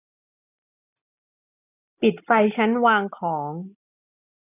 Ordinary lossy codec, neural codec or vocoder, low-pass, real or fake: none; none; 3.6 kHz; real